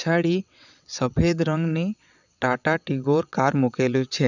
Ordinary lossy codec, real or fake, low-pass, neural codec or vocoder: none; fake; 7.2 kHz; vocoder, 22.05 kHz, 80 mel bands, WaveNeXt